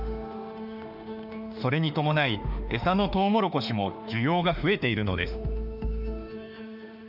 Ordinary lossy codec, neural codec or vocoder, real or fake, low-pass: none; autoencoder, 48 kHz, 32 numbers a frame, DAC-VAE, trained on Japanese speech; fake; 5.4 kHz